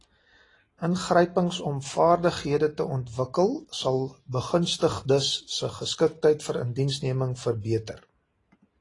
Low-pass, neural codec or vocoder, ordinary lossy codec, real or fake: 10.8 kHz; none; AAC, 32 kbps; real